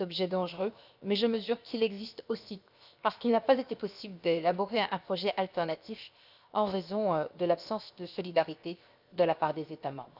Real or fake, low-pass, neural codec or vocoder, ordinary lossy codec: fake; 5.4 kHz; codec, 16 kHz, 0.7 kbps, FocalCodec; none